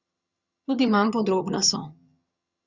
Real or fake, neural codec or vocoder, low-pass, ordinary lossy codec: fake; vocoder, 22.05 kHz, 80 mel bands, HiFi-GAN; 7.2 kHz; Opus, 64 kbps